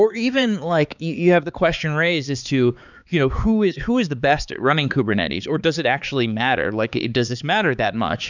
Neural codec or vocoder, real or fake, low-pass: codec, 16 kHz, 4 kbps, X-Codec, HuBERT features, trained on balanced general audio; fake; 7.2 kHz